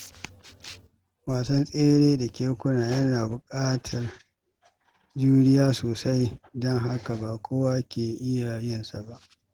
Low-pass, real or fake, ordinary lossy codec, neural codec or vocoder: 19.8 kHz; real; Opus, 16 kbps; none